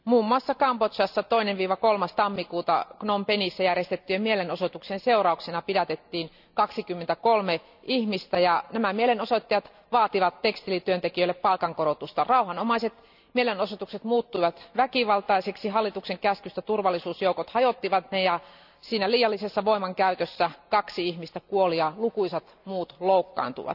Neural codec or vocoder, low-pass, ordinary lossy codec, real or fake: none; 5.4 kHz; none; real